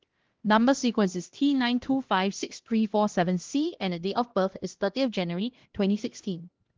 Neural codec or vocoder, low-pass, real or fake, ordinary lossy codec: codec, 16 kHz, 1 kbps, X-Codec, HuBERT features, trained on LibriSpeech; 7.2 kHz; fake; Opus, 16 kbps